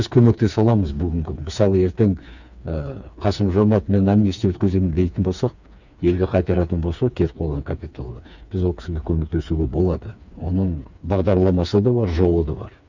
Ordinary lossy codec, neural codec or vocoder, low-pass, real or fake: none; codec, 16 kHz, 4 kbps, FreqCodec, smaller model; 7.2 kHz; fake